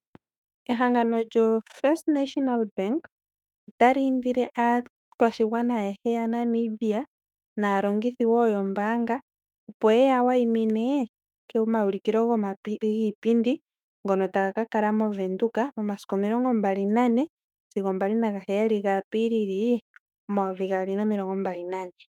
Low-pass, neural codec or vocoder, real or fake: 19.8 kHz; autoencoder, 48 kHz, 32 numbers a frame, DAC-VAE, trained on Japanese speech; fake